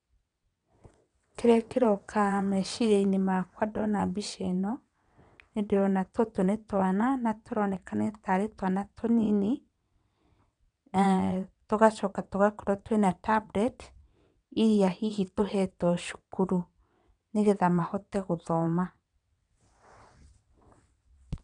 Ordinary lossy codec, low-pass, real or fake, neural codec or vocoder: none; 9.9 kHz; fake; vocoder, 22.05 kHz, 80 mel bands, WaveNeXt